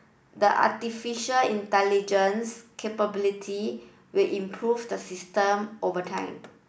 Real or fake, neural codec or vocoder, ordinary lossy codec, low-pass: real; none; none; none